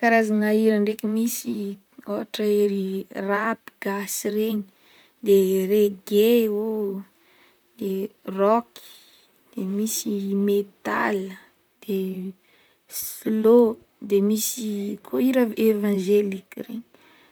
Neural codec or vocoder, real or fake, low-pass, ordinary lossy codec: vocoder, 44.1 kHz, 128 mel bands, Pupu-Vocoder; fake; none; none